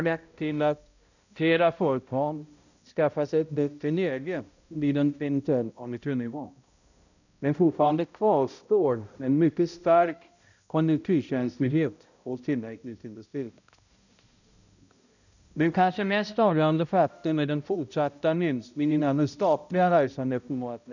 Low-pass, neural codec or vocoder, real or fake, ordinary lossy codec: 7.2 kHz; codec, 16 kHz, 0.5 kbps, X-Codec, HuBERT features, trained on balanced general audio; fake; none